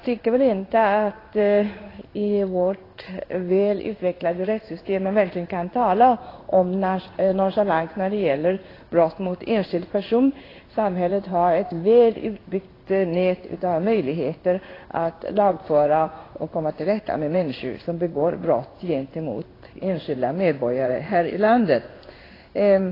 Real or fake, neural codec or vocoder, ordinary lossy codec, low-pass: fake; codec, 16 kHz in and 24 kHz out, 1 kbps, XY-Tokenizer; AAC, 24 kbps; 5.4 kHz